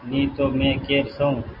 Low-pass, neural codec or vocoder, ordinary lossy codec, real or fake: 5.4 kHz; none; AAC, 48 kbps; real